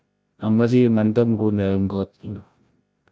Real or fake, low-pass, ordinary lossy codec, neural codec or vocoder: fake; none; none; codec, 16 kHz, 0.5 kbps, FreqCodec, larger model